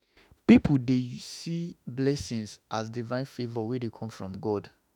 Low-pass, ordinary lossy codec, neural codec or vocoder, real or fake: 19.8 kHz; none; autoencoder, 48 kHz, 32 numbers a frame, DAC-VAE, trained on Japanese speech; fake